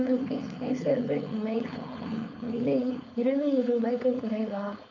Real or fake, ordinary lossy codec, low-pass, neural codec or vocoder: fake; none; 7.2 kHz; codec, 16 kHz, 4.8 kbps, FACodec